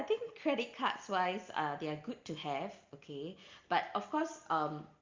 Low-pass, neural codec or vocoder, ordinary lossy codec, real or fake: 7.2 kHz; none; Opus, 24 kbps; real